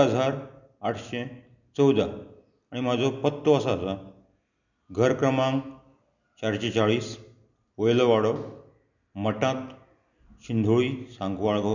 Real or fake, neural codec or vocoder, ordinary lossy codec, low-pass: real; none; none; 7.2 kHz